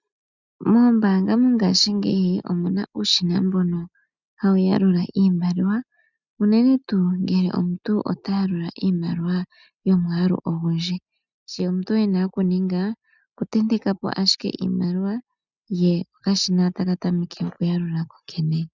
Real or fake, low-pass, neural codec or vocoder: real; 7.2 kHz; none